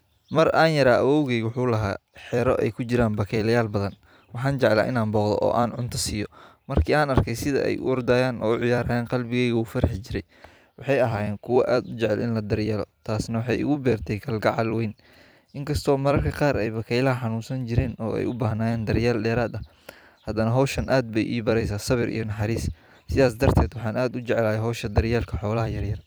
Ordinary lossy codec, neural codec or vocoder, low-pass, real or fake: none; vocoder, 44.1 kHz, 128 mel bands every 256 samples, BigVGAN v2; none; fake